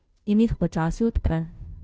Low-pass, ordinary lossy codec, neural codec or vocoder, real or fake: none; none; codec, 16 kHz, 0.5 kbps, FunCodec, trained on Chinese and English, 25 frames a second; fake